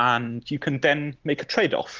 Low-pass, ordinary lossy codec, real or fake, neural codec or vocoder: 7.2 kHz; Opus, 16 kbps; real; none